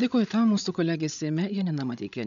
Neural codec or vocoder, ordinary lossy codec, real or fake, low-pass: codec, 16 kHz, 8 kbps, FreqCodec, larger model; MP3, 96 kbps; fake; 7.2 kHz